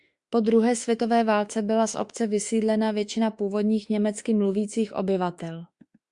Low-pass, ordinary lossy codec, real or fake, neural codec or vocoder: 10.8 kHz; Opus, 64 kbps; fake; autoencoder, 48 kHz, 32 numbers a frame, DAC-VAE, trained on Japanese speech